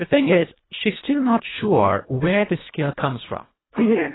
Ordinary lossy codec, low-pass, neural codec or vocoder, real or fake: AAC, 16 kbps; 7.2 kHz; codec, 24 kHz, 1.5 kbps, HILCodec; fake